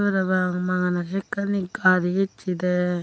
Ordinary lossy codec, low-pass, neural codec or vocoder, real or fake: none; none; none; real